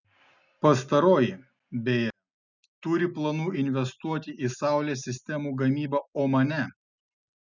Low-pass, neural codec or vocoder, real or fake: 7.2 kHz; none; real